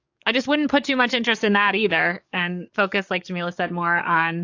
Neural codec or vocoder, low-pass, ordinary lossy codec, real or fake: codec, 44.1 kHz, 7.8 kbps, DAC; 7.2 kHz; AAC, 48 kbps; fake